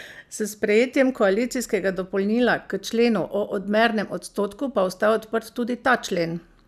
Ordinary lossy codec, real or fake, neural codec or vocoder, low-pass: none; real; none; 14.4 kHz